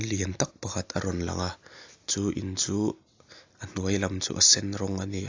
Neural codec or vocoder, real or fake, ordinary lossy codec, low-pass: none; real; AAC, 48 kbps; 7.2 kHz